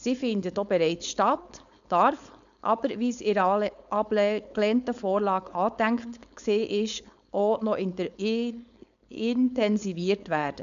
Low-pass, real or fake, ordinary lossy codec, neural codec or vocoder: 7.2 kHz; fake; none; codec, 16 kHz, 4.8 kbps, FACodec